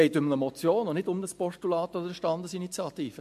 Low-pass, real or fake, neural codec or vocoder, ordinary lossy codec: 14.4 kHz; real; none; MP3, 64 kbps